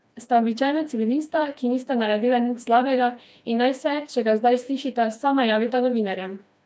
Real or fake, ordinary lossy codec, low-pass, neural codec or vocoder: fake; none; none; codec, 16 kHz, 2 kbps, FreqCodec, smaller model